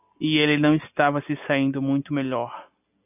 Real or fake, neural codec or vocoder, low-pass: real; none; 3.6 kHz